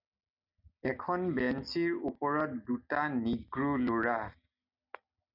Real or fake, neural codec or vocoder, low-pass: real; none; 5.4 kHz